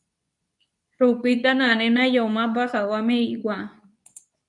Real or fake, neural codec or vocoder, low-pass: fake; codec, 24 kHz, 0.9 kbps, WavTokenizer, medium speech release version 2; 10.8 kHz